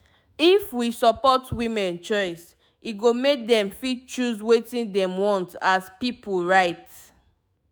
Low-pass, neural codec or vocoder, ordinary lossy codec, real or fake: none; autoencoder, 48 kHz, 128 numbers a frame, DAC-VAE, trained on Japanese speech; none; fake